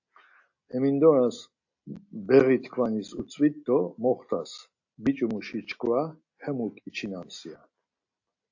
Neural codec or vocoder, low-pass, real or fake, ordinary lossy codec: none; 7.2 kHz; real; AAC, 48 kbps